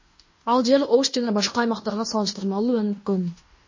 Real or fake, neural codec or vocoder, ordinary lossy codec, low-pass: fake; codec, 16 kHz in and 24 kHz out, 0.9 kbps, LongCat-Audio-Codec, fine tuned four codebook decoder; MP3, 32 kbps; 7.2 kHz